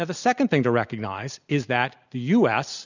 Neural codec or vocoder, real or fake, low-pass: none; real; 7.2 kHz